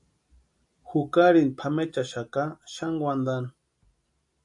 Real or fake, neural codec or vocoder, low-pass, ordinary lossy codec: real; none; 10.8 kHz; AAC, 64 kbps